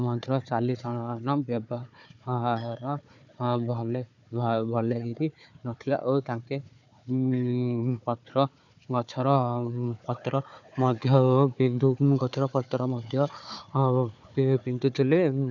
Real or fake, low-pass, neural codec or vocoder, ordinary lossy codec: fake; 7.2 kHz; codec, 16 kHz, 4 kbps, FunCodec, trained on Chinese and English, 50 frames a second; none